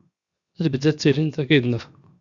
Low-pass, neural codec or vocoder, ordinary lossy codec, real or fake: 7.2 kHz; codec, 16 kHz, 0.7 kbps, FocalCodec; Opus, 64 kbps; fake